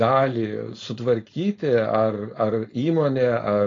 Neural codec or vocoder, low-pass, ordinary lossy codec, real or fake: codec, 16 kHz, 4.8 kbps, FACodec; 7.2 kHz; AAC, 32 kbps; fake